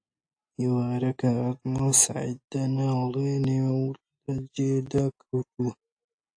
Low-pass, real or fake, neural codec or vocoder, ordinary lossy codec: 9.9 kHz; real; none; AAC, 64 kbps